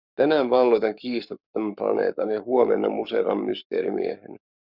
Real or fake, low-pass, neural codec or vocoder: fake; 5.4 kHz; codec, 44.1 kHz, 7.8 kbps, Pupu-Codec